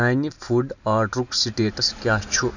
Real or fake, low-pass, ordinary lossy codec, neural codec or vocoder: real; 7.2 kHz; none; none